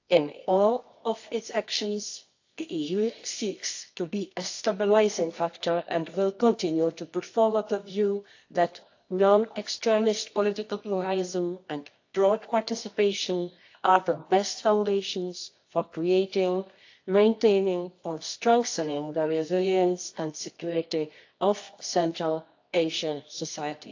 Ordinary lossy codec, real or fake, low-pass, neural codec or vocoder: AAC, 48 kbps; fake; 7.2 kHz; codec, 24 kHz, 0.9 kbps, WavTokenizer, medium music audio release